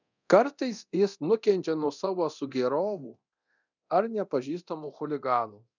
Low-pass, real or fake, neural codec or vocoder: 7.2 kHz; fake; codec, 24 kHz, 0.9 kbps, DualCodec